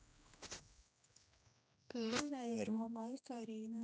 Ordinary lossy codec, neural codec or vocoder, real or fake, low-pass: none; codec, 16 kHz, 1 kbps, X-Codec, HuBERT features, trained on general audio; fake; none